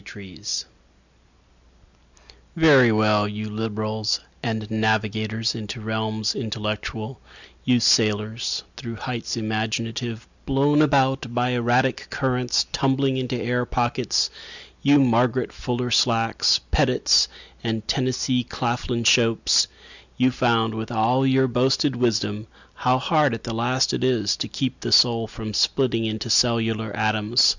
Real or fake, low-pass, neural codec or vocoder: real; 7.2 kHz; none